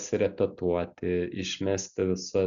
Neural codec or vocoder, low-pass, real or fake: none; 7.2 kHz; real